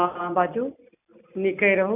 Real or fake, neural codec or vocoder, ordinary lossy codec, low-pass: real; none; none; 3.6 kHz